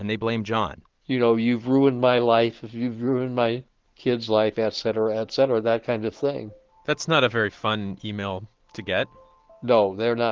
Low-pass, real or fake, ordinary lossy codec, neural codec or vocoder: 7.2 kHz; real; Opus, 16 kbps; none